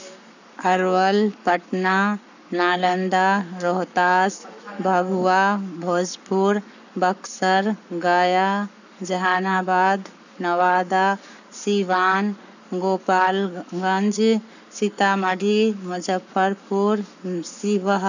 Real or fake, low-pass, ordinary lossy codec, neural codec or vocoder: fake; 7.2 kHz; none; codec, 44.1 kHz, 7.8 kbps, Pupu-Codec